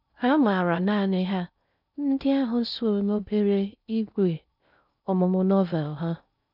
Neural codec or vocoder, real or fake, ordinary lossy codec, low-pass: codec, 16 kHz in and 24 kHz out, 0.6 kbps, FocalCodec, streaming, 2048 codes; fake; none; 5.4 kHz